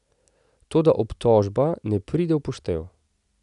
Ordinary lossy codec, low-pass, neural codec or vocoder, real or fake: none; 10.8 kHz; none; real